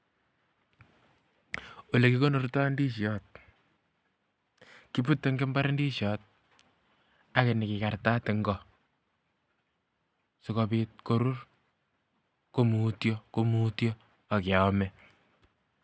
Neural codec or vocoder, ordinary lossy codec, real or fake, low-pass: none; none; real; none